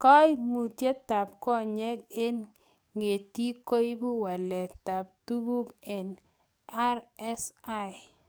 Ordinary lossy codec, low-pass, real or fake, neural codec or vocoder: none; none; fake; codec, 44.1 kHz, 7.8 kbps, DAC